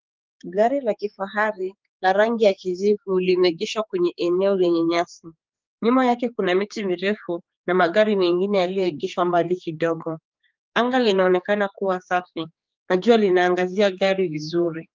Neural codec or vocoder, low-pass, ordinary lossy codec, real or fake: codec, 16 kHz, 4 kbps, X-Codec, HuBERT features, trained on general audio; 7.2 kHz; Opus, 32 kbps; fake